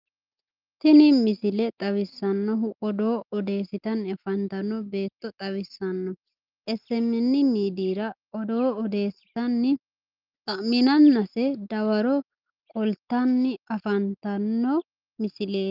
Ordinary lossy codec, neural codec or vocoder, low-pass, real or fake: Opus, 24 kbps; none; 5.4 kHz; real